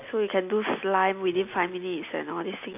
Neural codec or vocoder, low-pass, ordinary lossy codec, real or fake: none; 3.6 kHz; none; real